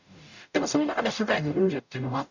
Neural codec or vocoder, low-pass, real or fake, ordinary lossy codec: codec, 44.1 kHz, 0.9 kbps, DAC; 7.2 kHz; fake; none